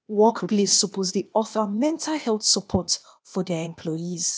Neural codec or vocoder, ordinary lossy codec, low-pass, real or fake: codec, 16 kHz, 0.8 kbps, ZipCodec; none; none; fake